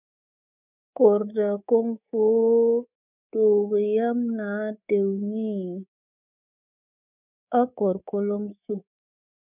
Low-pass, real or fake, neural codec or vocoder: 3.6 kHz; fake; codec, 24 kHz, 3.1 kbps, DualCodec